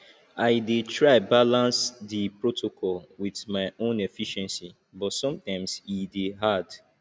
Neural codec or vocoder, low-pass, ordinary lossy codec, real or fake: none; none; none; real